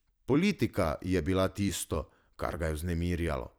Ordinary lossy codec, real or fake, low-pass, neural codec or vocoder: none; fake; none; vocoder, 44.1 kHz, 128 mel bands every 256 samples, BigVGAN v2